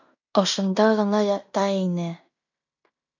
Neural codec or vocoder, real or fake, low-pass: codec, 16 kHz in and 24 kHz out, 0.9 kbps, LongCat-Audio-Codec, fine tuned four codebook decoder; fake; 7.2 kHz